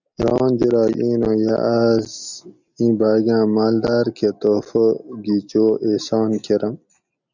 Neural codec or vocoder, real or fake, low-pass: none; real; 7.2 kHz